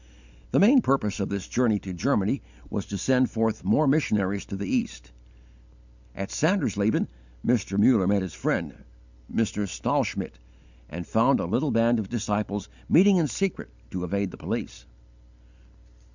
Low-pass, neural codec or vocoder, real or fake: 7.2 kHz; none; real